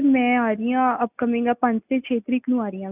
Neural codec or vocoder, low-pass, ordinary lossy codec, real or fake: none; 3.6 kHz; none; real